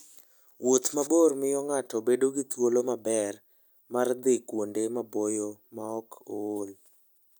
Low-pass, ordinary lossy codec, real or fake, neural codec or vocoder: none; none; real; none